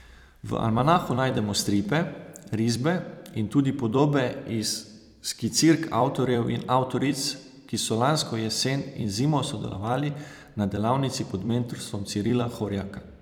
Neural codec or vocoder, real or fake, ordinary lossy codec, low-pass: vocoder, 44.1 kHz, 128 mel bands every 256 samples, BigVGAN v2; fake; none; 19.8 kHz